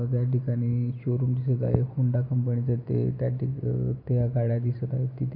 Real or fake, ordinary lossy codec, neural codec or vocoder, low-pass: real; MP3, 32 kbps; none; 5.4 kHz